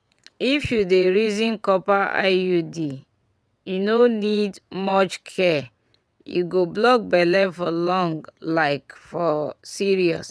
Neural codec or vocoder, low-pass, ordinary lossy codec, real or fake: vocoder, 22.05 kHz, 80 mel bands, WaveNeXt; none; none; fake